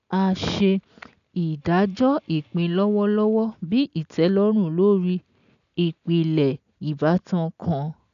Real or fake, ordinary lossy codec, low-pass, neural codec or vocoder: real; none; 7.2 kHz; none